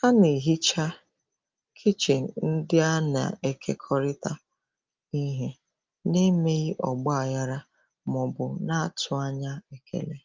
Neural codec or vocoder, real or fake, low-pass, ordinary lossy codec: none; real; 7.2 kHz; Opus, 24 kbps